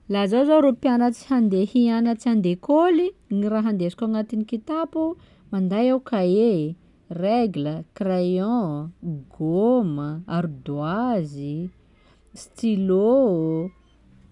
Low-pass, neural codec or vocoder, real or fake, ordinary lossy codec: 10.8 kHz; none; real; none